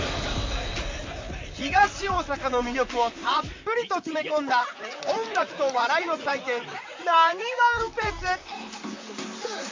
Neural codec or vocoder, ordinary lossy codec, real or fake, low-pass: vocoder, 44.1 kHz, 128 mel bands, Pupu-Vocoder; MP3, 48 kbps; fake; 7.2 kHz